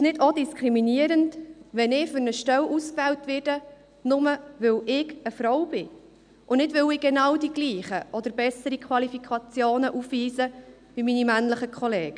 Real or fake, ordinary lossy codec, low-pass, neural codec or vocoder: real; none; 9.9 kHz; none